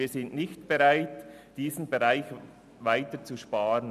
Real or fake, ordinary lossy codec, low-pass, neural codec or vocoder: real; none; 14.4 kHz; none